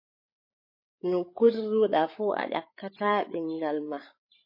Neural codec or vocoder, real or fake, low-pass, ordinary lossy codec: codec, 16 kHz, 8 kbps, FreqCodec, larger model; fake; 5.4 kHz; MP3, 24 kbps